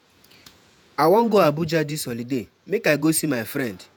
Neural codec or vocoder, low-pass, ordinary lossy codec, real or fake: vocoder, 48 kHz, 128 mel bands, Vocos; none; none; fake